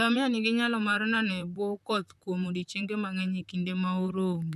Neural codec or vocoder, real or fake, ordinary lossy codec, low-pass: vocoder, 44.1 kHz, 128 mel bands, Pupu-Vocoder; fake; none; 14.4 kHz